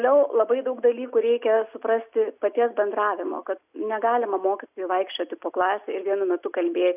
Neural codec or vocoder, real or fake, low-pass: none; real; 3.6 kHz